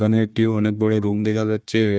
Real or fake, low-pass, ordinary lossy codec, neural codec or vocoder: fake; none; none; codec, 16 kHz, 1 kbps, FunCodec, trained on Chinese and English, 50 frames a second